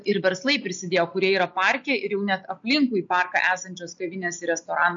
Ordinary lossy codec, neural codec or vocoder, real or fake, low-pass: MP3, 48 kbps; none; real; 7.2 kHz